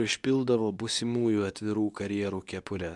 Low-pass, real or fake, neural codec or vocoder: 10.8 kHz; fake; codec, 24 kHz, 0.9 kbps, WavTokenizer, medium speech release version 2